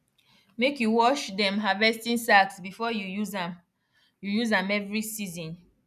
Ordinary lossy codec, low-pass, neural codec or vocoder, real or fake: none; 14.4 kHz; none; real